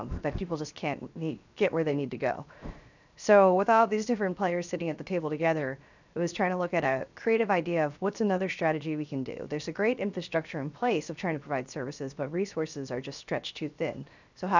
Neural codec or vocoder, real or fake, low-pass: codec, 16 kHz, 0.7 kbps, FocalCodec; fake; 7.2 kHz